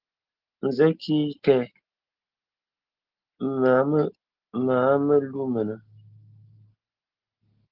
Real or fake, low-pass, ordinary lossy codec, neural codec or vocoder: real; 5.4 kHz; Opus, 16 kbps; none